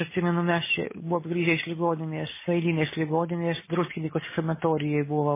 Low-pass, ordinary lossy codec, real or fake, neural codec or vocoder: 3.6 kHz; MP3, 16 kbps; real; none